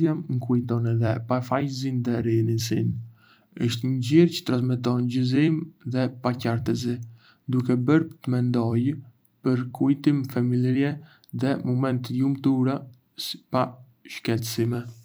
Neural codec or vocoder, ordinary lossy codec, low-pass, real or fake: vocoder, 44.1 kHz, 128 mel bands every 256 samples, BigVGAN v2; none; none; fake